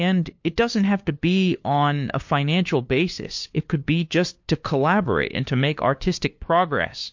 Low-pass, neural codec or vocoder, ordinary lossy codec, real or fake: 7.2 kHz; codec, 16 kHz, 2 kbps, FunCodec, trained on LibriTTS, 25 frames a second; MP3, 48 kbps; fake